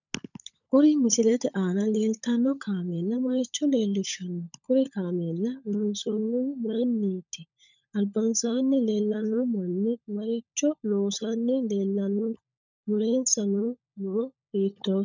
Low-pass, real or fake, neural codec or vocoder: 7.2 kHz; fake; codec, 16 kHz, 16 kbps, FunCodec, trained on LibriTTS, 50 frames a second